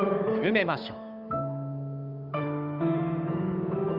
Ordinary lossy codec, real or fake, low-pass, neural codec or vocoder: none; fake; 5.4 kHz; codec, 16 kHz, 8 kbps, FunCodec, trained on Chinese and English, 25 frames a second